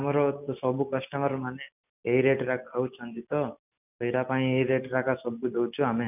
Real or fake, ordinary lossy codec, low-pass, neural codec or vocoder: real; none; 3.6 kHz; none